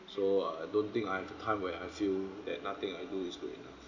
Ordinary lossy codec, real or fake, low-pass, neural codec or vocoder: none; real; 7.2 kHz; none